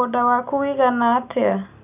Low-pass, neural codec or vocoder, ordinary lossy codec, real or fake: 3.6 kHz; none; none; real